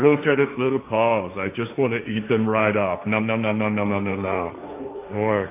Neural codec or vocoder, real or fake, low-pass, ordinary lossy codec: codec, 16 kHz, 1.1 kbps, Voila-Tokenizer; fake; 3.6 kHz; AAC, 24 kbps